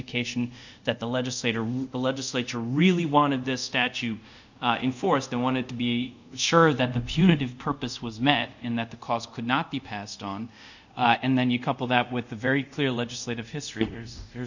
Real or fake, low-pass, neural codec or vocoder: fake; 7.2 kHz; codec, 24 kHz, 0.5 kbps, DualCodec